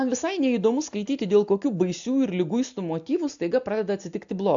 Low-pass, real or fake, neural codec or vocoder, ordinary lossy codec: 7.2 kHz; real; none; AAC, 64 kbps